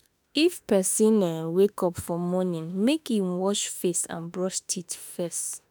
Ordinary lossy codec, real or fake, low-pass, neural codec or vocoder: none; fake; none; autoencoder, 48 kHz, 32 numbers a frame, DAC-VAE, trained on Japanese speech